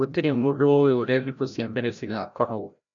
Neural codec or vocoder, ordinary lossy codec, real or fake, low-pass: codec, 16 kHz, 0.5 kbps, FreqCodec, larger model; none; fake; 7.2 kHz